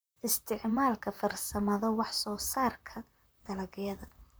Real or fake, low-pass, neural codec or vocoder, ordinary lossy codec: fake; none; vocoder, 44.1 kHz, 128 mel bands, Pupu-Vocoder; none